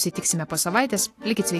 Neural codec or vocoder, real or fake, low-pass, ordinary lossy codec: none; real; 14.4 kHz; AAC, 64 kbps